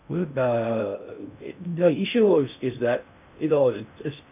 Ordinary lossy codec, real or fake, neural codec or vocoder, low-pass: none; fake; codec, 16 kHz in and 24 kHz out, 0.6 kbps, FocalCodec, streaming, 4096 codes; 3.6 kHz